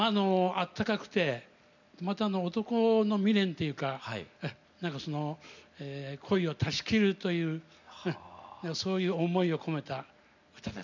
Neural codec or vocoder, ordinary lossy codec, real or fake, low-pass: none; none; real; 7.2 kHz